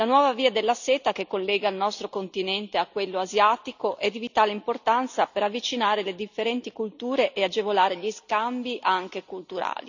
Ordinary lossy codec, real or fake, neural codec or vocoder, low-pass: none; real; none; 7.2 kHz